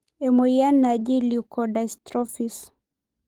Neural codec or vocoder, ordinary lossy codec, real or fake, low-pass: codec, 44.1 kHz, 7.8 kbps, DAC; Opus, 24 kbps; fake; 19.8 kHz